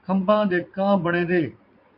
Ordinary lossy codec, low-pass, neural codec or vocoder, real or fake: MP3, 48 kbps; 5.4 kHz; vocoder, 44.1 kHz, 80 mel bands, Vocos; fake